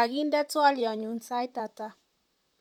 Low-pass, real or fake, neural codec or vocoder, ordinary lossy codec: 19.8 kHz; fake; vocoder, 44.1 kHz, 128 mel bands, Pupu-Vocoder; none